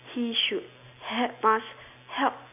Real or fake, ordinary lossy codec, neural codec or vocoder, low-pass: real; none; none; 3.6 kHz